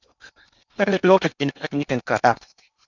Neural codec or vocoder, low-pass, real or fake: codec, 16 kHz in and 24 kHz out, 0.8 kbps, FocalCodec, streaming, 65536 codes; 7.2 kHz; fake